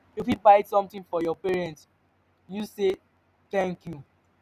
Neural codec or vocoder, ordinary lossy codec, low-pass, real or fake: none; none; 14.4 kHz; real